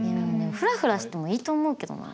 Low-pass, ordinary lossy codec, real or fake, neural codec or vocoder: none; none; real; none